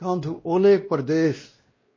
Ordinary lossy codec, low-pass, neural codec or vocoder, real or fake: MP3, 32 kbps; 7.2 kHz; codec, 16 kHz, 1 kbps, X-Codec, WavLM features, trained on Multilingual LibriSpeech; fake